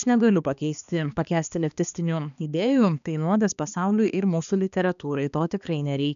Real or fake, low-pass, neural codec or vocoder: fake; 7.2 kHz; codec, 16 kHz, 2 kbps, X-Codec, HuBERT features, trained on balanced general audio